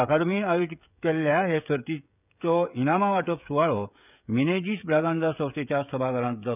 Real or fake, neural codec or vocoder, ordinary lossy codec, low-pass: fake; codec, 16 kHz, 16 kbps, FreqCodec, smaller model; none; 3.6 kHz